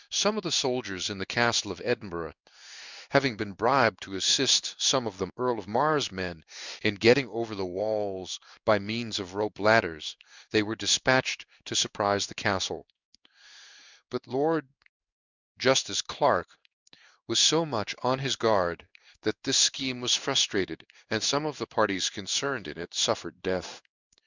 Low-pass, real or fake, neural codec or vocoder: 7.2 kHz; fake; codec, 16 kHz in and 24 kHz out, 1 kbps, XY-Tokenizer